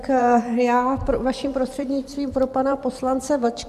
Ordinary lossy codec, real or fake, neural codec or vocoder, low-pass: MP3, 96 kbps; fake; vocoder, 44.1 kHz, 128 mel bands every 512 samples, BigVGAN v2; 14.4 kHz